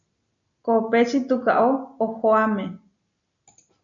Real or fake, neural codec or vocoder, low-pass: real; none; 7.2 kHz